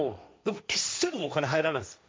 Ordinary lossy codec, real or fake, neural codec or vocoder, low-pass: none; fake; codec, 16 kHz, 1.1 kbps, Voila-Tokenizer; none